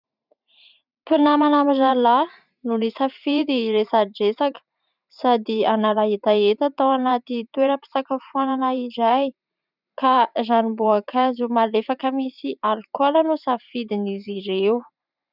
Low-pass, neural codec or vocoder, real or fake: 5.4 kHz; vocoder, 44.1 kHz, 128 mel bands every 512 samples, BigVGAN v2; fake